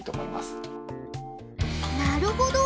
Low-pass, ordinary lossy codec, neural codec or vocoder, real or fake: none; none; none; real